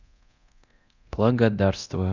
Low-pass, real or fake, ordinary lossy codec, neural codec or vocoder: 7.2 kHz; fake; none; codec, 24 kHz, 0.9 kbps, DualCodec